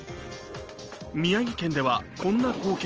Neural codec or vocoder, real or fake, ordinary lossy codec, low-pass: none; real; Opus, 24 kbps; 7.2 kHz